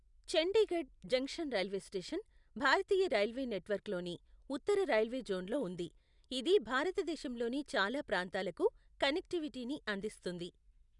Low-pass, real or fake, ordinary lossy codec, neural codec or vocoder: 10.8 kHz; real; none; none